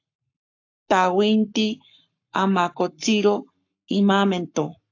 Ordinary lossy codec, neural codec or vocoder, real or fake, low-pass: AAC, 48 kbps; codec, 44.1 kHz, 7.8 kbps, Pupu-Codec; fake; 7.2 kHz